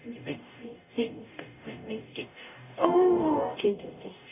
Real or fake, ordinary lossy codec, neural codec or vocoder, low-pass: fake; none; codec, 44.1 kHz, 0.9 kbps, DAC; 3.6 kHz